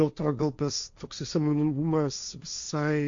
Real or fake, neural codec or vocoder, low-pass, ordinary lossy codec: fake; codec, 16 kHz, 1.1 kbps, Voila-Tokenizer; 7.2 kHz; Opus, 64 kbps